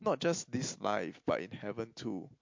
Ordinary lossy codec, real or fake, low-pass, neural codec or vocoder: MP3, 48 kbps; real; 7.2 kHz; none